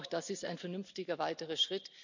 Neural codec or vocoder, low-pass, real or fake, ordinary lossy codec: none; 7.2 kHz; real; none